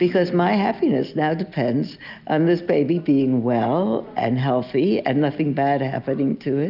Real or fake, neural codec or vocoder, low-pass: real; none; 5.4 kHz